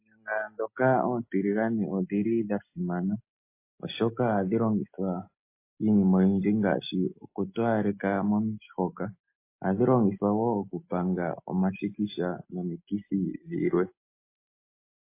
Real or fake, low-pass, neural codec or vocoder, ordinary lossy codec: real; 3.6 kHz; none; MP3, 24 kbps